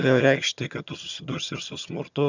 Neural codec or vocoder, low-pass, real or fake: vocoder, 22.05 kHz, 80 mel bands, HiFi-GAN; 7.2 kHz; fake